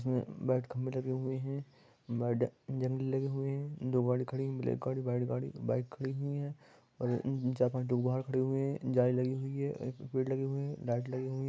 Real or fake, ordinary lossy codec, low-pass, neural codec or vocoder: real; none; none; none